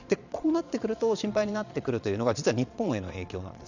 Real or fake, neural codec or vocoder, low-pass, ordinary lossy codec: fake; vocoder, 22.05 kHz, 80 mel bands, Vocos; 7.2 kHz; none